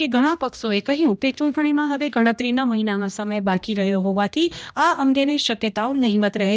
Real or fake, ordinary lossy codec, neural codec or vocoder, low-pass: fake; none; codec, 16 kHz, 1 kbps, X-Codec, HuBERT features, trained on general audio; none